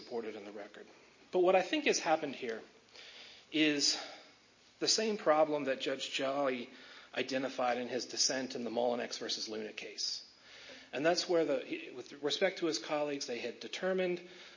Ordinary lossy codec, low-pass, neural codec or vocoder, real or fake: MP3, 32 kbps; 7.2 kHz; none; real